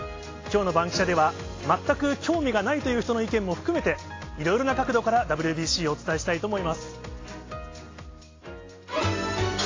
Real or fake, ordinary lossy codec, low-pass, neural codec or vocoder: real; AAC, 32 kbps; 7.2 kHz; none